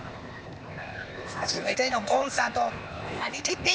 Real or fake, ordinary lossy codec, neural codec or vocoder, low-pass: fake; none; codec, 16 kHz, 0.8 kbps, ZipCodec; none